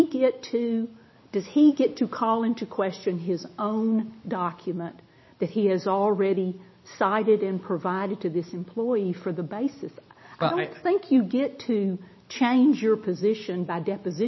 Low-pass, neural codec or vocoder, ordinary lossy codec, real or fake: 7.2 kHz; none; MP3, 24 kbps; real